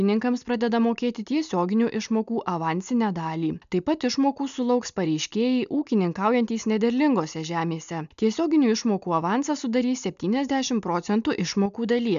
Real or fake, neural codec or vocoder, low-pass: real; none; 7.2 kHz